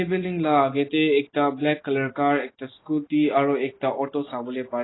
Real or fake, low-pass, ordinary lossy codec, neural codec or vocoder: real; 7.2 kHz; AAC, 16 kbps; none